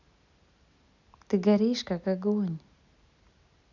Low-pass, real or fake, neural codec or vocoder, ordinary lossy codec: 7.2 kHz; real; none; none